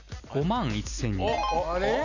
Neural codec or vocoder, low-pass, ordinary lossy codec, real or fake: none; 7.2 kHz; none; real